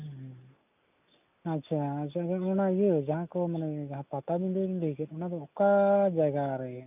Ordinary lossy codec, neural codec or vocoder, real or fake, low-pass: none; none; real; 3.6 kHz